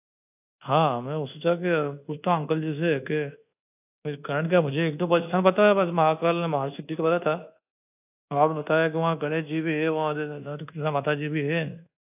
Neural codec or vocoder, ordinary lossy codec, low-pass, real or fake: codec, 24 kHz, 1.2 kbps, DualCodec; AAC, 32 kbps; 3.6 kHz; fake